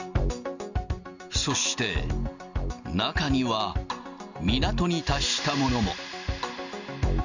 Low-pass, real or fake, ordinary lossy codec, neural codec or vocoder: 7.2 kHz; real; Opus, 64 kbps; none